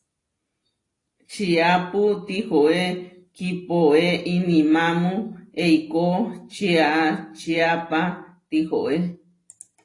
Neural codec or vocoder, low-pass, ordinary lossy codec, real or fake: none; 10.8 kHz; AAC, 32 kbps; real